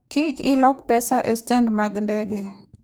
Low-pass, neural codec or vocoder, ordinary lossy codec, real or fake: none; codec, 44.1 kHz, 2.6 kbps, DAC; none; fake